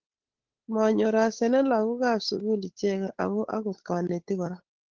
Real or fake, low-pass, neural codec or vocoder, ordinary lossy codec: fake; 7.2 kHz; codec, 16 kHz, 8 kbps, FunCodec, trained on Chinese and English, 25 frames a second; Opus, 16 kbps